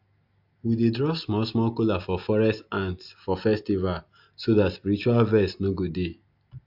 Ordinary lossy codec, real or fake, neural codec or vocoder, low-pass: AAC, 48 kbps; real; none; 5.4 kHz